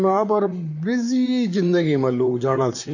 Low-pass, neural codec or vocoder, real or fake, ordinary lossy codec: 7.2 kHz; vocoder, 44.1 kHz, 128 mel bands, Pupu-Vocoder; fake; none